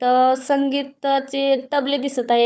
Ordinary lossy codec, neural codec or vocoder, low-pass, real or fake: none; codec, 16 kHz, 4 kbps, FunCodec, trained on Chinese and English, 50 frames a second; none; fake